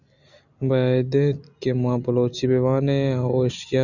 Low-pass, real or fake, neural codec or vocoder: 7.2 kHz; real; none